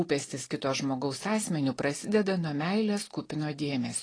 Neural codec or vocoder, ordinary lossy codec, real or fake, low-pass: none; AAC, 32 kbps; real; 9.9 kHz